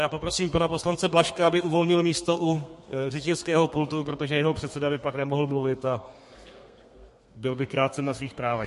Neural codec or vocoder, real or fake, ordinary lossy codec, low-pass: codec, 32 kHz, 1.9 kbps, SNAC; fake; MP3, 48 kbps; 14.4 kHz